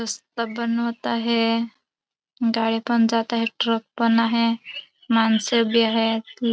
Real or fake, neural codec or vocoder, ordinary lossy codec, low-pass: real; none; none; none